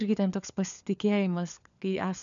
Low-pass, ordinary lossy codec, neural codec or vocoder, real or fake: 7.2 kHz; MP3, 96 kbps; codec, 16 kHz, 4 kbps, FunCodec, trained on LibriTTS, 50 frames a second; fake